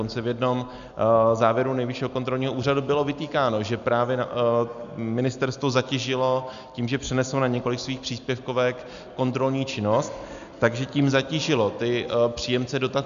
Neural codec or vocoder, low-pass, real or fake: none; 7.2 kHz; real